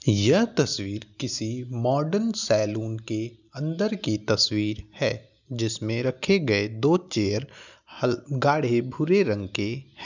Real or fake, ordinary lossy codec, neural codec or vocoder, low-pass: real; none; none; 7.2 kHz